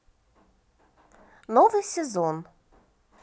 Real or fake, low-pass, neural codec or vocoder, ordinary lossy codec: real; none; none; none